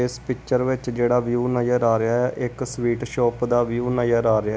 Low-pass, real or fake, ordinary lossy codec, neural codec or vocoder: none; real; none; none